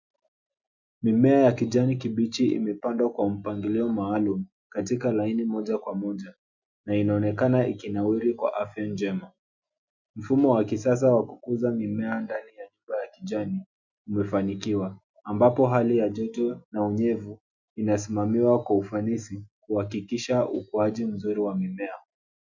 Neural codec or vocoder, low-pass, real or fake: none; 7.2 kHz; real